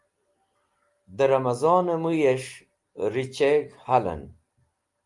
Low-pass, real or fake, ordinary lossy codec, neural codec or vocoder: 10.8 kHz; real; Opus, 32 kbps; none